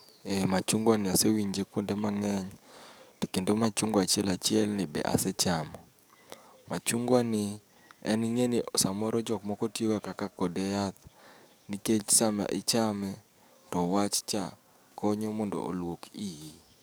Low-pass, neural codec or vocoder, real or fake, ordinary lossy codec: none; codec, 44.1 kHz, 7.8 kbps, DAC; fake; none